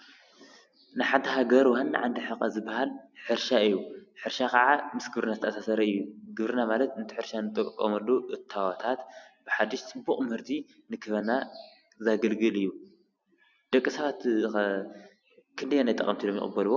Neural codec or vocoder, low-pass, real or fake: none; 7.2 kHz; real